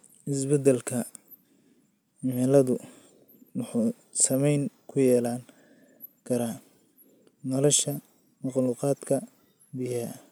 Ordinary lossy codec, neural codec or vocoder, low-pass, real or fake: none; none; none; real